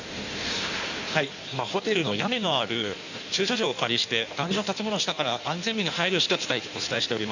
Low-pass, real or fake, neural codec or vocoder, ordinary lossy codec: 7.2 kHz; fake; codec, 16 kHz in and 24 kHz out, 1.1 kbps, FireRedTTS-2 codec; none